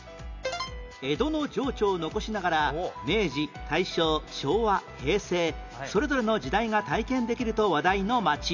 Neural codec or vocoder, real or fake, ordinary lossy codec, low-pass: none; real; none; 7.2 kHz